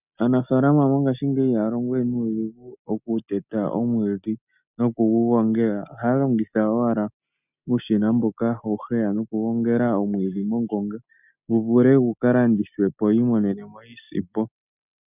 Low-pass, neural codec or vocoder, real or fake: 3.6 kHz; none; real